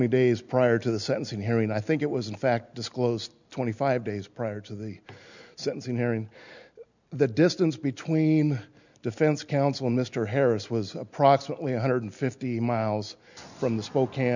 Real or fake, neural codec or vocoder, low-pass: real; none; 7.2 kHz